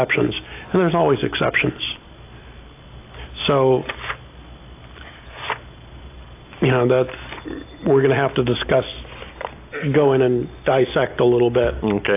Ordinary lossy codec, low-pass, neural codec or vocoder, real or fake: AAC, 24 kbps; 3.6 kHz; none; real